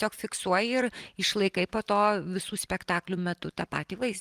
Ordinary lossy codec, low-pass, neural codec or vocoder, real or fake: Opus, 24 kbps; 14.4 kHz; none; real